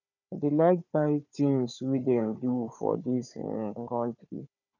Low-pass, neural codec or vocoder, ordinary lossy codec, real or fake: 7.2 kHz; codec, 16 kHz, 4 kbps, FunCodec, trained on Chinese and English, 50 frames a second; none; fake